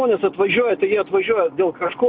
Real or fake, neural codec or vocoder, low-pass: real; none; 5.4 kHz